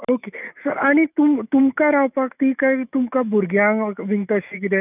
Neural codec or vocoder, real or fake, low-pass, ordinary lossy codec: autoencoder, 48 kHz, 128 numbers a frame, DAC-VAE, trained on Japanese speech; fake; 3.6 kHz; none